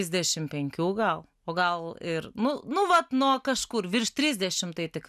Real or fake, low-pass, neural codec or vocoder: real; 14.4 kHz; none